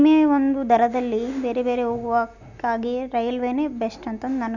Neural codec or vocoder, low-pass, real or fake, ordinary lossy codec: none; 7.2 kHz; real; none